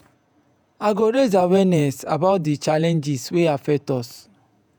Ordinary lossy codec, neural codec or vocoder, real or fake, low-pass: none; vocoder, 48 kHz, 128 mel bands, Vocos; fake; none